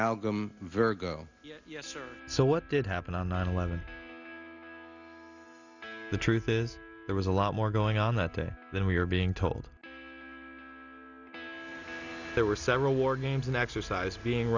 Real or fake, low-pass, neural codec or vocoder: real; 7.2 kHz; none